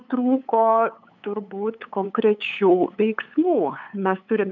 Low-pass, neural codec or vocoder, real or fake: 7.2 kHz; codec, 16 kHz, 8 kbps, FunCodec, trained on LibriTTS, 25 frames a second; fake